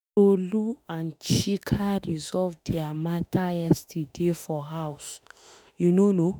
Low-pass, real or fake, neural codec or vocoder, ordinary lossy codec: none; fake; autoencoder, 48 kHz, 32 numbers a frame, DAC-VAE, trained on Japanese speech; none